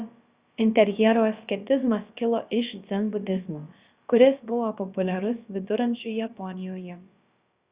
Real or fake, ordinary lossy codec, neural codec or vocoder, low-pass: fake; Opus, 64 kbps; codec, 16 kHz, about 1 kbps, DyCAST, with the encoder's durations; 3.6 kHz